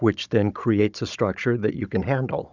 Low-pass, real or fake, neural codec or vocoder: 7.2 kHz; fake; codec, 16 kHz, 16 kbps, FunCodec, trained on Chinese and English, 50 frames a second